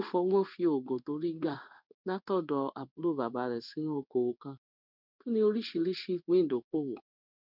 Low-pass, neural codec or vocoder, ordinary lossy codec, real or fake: 5.4 kHz; codec, 16 kHz in and 24 kHz out, 1 kbps, XY-Tokenizer; none; fake